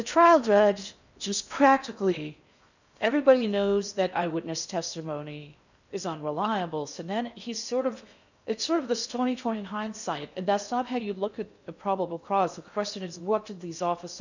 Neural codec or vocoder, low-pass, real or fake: codec, 16 kHz in and 24 kHz out, 0.6 kbps, FocalCodec, streaming, 4096 codes; 7.2 kHz; fake